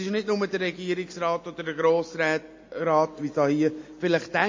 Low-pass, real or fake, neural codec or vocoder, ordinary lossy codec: 7.2 kHz; real; none; MP3, 32 kbps